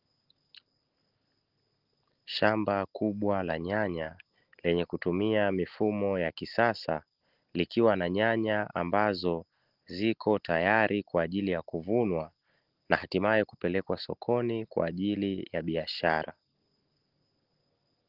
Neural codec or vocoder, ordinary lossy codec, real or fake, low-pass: none; Opus, 16 kbps; real; 5.4 kHz